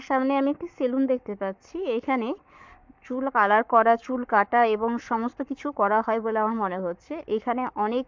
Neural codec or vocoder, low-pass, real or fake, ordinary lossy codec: codec, 44.1 kHz, 7.8 kbps, Pupu-Codec; 7.2 kHz; fake; MP3, 64 kbps